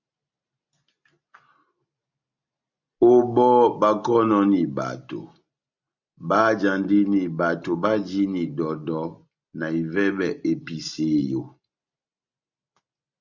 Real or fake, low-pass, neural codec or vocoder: real; 7.2 kHz; none